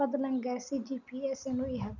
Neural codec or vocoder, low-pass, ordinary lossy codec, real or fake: none; 7.2 kHz; none; real